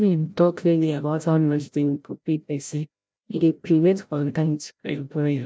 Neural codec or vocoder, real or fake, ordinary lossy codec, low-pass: codec, 16 kHz, 0.5 kbps, FreqCodec, larger model; fake; none; none